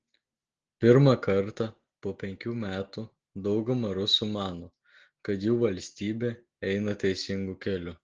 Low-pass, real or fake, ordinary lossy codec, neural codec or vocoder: 7.2 kHz; real; Opus, 16 kbps; none